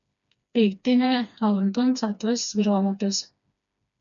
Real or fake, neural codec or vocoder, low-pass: fake; codec, 16 kHz, 2 kbps, FreqCodec, smaller model; 7.2 kHz